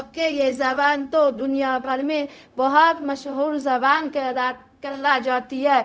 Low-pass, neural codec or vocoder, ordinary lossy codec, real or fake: none; codec, 16 kHz, 0.4 kbps, LongCat-Audio-Codec; none; fake